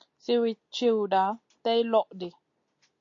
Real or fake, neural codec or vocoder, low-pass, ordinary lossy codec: real; none; 7.2 kHz; AAC, 48 kbps